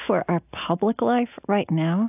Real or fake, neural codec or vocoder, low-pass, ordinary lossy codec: real; none; 3.6 kHz; AAC, 32 kbps